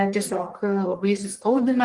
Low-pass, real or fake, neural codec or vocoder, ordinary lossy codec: 10.8 kHz; fake; codec, 44.1 kHz, 1.7 kbps, Pupu-Codec; Opus, 24 kbps